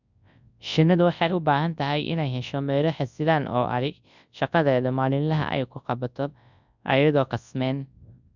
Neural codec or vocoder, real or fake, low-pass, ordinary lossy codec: codec, 24 kHz, 0.9 kbps, WavTokenizer, large speech release; fake; 7.2 kHz; none